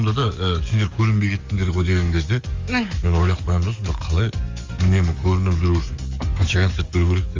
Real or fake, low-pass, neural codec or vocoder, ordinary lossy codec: fake; 7.2 kHz; codec, 44.1 kHz, 7.8 kbps, DAC; Opus, 32 kbps